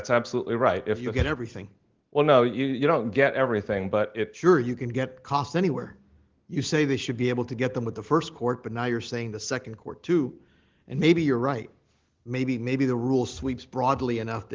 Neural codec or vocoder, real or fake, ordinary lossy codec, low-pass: none; real; Opus, 16 kbps; 7.2 kHz